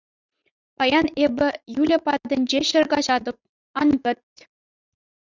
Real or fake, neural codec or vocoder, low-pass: fake; vocoder, 44.1 kHz, 80 mel bands, Vocos; 7.2 kHz